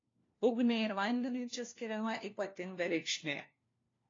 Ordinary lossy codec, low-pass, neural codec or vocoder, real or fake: AAC, 32 kbps; 7.2 kHz; codec, 16 kHz, 1 kbps, FunCodec, trained on LibriTTS, 50 frames a second; fake